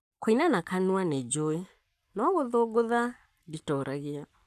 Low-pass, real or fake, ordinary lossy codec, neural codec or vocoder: 14.4 kHz; fake; none; codec, 44.1 kHz, 7.8 kbps, Pupu-Codec